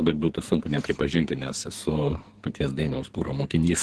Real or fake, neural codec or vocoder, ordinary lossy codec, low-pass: fake; codec, 44.1 kHz, 2.6 kbps, SNAC; Opus, 16 kbps; 10.8 kHz